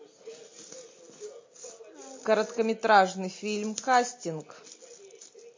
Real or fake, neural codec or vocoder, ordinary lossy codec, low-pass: real; none; MP3, 32 kbps; 7.2 kHz